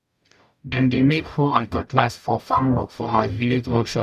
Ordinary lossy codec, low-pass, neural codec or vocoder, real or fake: none; 14.4 kHz; codec, 44.1 kHz, 0.9 kbps, DAC; fake